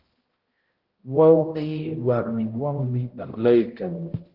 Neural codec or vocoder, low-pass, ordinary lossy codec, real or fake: codec, 16 kHz, 0.5 kbps, X-Codec, HuBERT features, trained on balanced general audio; 5.4 kHz; Opus, 16 kbps; fake